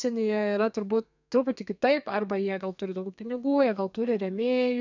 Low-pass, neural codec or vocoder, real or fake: 7.2 kHz; codec, 32 kHz, 1.9 kbps, SNAC; fake